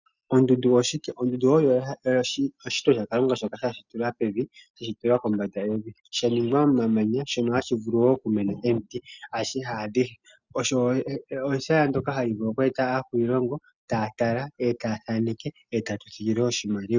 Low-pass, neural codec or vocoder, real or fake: 7.2 kHz; none; real